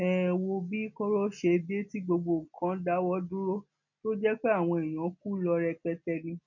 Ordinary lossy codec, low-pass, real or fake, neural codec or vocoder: none; 7.2 kHz; real; none